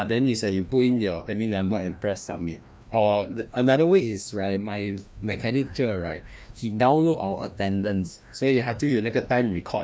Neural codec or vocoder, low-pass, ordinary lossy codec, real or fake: codec, 16 kHz, 1 kbps, FreqCodec, larger model; none; none; fake